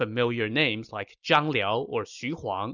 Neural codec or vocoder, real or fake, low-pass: none; real; 7.2 kHz